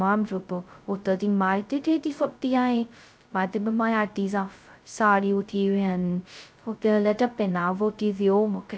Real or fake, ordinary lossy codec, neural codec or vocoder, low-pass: fake; none; codec, 16 kHz, 0.2 kbps, FocalCodec; none